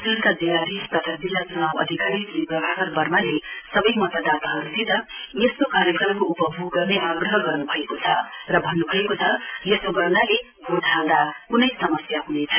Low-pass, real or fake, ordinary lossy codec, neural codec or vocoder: 3.6 kHz; real; AAC, 32 kbps; none